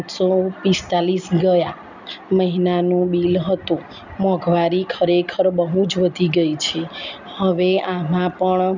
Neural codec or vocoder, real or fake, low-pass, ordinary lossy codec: none; real; 7.2 kHz; none